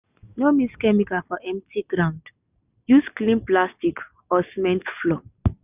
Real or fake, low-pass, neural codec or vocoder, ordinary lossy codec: real; 3.6 kHz; none; none